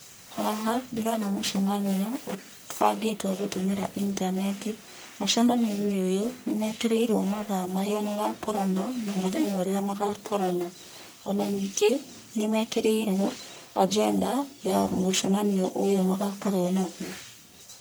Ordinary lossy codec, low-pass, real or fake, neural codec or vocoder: none; none; fake; codec, 44.1 kHz, 1.7 kbps, Pupu-Codec